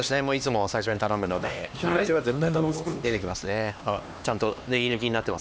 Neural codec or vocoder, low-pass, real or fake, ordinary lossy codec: codec, 16 kHz, 2 kbps, X-Codec, HuBERT features, trained on LibriSpeech; none; fake; none